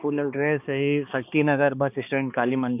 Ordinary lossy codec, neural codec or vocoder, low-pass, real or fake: none; codec, 16 kHz, 2 kbps, X-Codec, HuBERT features, trained on balanced general audio; 3.6 kHz; fake